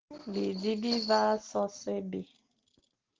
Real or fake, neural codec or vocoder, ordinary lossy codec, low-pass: real; none; Opus, 16 kbps; 7.2 kHz